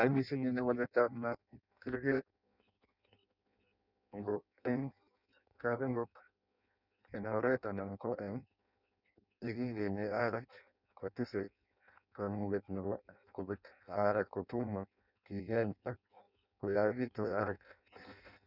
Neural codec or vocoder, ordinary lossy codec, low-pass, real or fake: codec, 16 kHz in and 24 kHz out, 0.6 kbps, FireRedTTS-2 codec; none; 5.4 kHz; fake